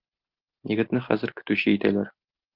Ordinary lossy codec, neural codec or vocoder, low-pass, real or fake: Opus, 32 kbps; none; 5.4 kHz; real